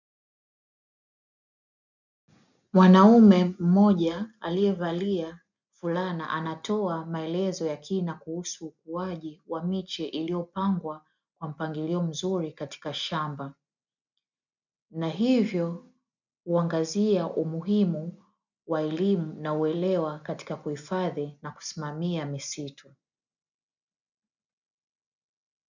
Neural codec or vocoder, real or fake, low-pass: none; real; 7.2 kHz